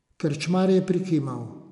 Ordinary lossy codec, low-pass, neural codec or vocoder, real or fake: none; 10.8 kHz; none; real